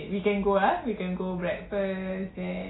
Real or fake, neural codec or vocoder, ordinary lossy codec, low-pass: real; none; AAC, 16 kbps; 7.2 kHz